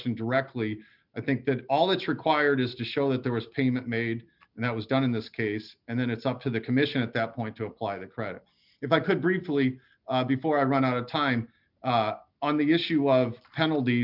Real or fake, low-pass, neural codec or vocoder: real; 5.4 kHz; none